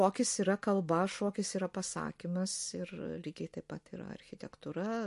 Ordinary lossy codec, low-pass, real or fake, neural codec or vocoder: MP3, 48 kbps; 14.4 kHz; real; none